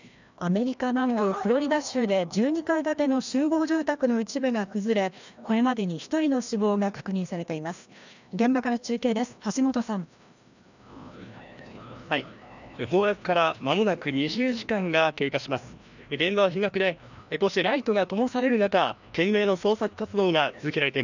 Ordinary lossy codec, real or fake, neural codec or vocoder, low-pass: none; fake; codec, 16 kHz, 1 kbps, FreqCodec, larger model; 7.2 kHz